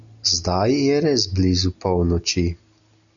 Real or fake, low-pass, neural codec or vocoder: real; 7.2 kHz; none